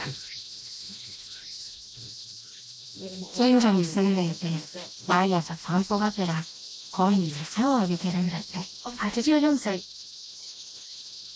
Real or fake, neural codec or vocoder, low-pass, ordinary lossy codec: fake; codec, 16 kHz, 1 kbps, FreqCodec, smaller model; none; none